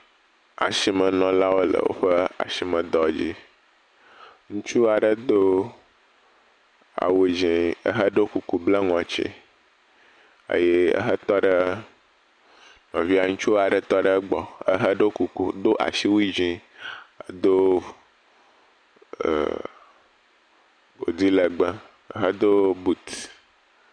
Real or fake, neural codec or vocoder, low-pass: real; none; 9.9 kHz